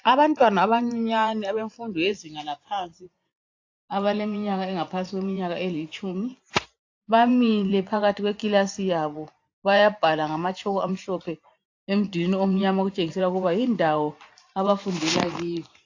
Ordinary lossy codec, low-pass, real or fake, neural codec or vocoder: AAC, 48 kbps; 7.2 kHz; fake; vocoder, 44.1 kHz, 128 mel bands every 512 samples, BigVGAN v2